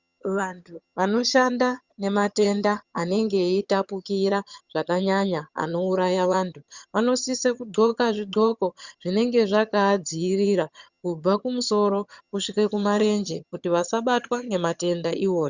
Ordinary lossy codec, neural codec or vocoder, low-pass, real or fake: Opus, 64 kbps; vocoder, 22.05 kHz, 80 mel bands, HiFi-GAN; 7.2 kHz; fake